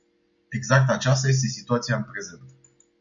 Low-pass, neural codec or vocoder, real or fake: 7.2 kHz; none; real